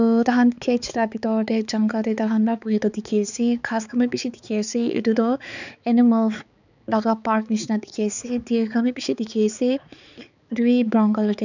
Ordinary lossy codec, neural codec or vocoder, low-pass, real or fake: none; codec, 16 kHz, 4 kbps, X-Codec, HuBERT features, trained on balanced general audio; 7.2 kHz; fake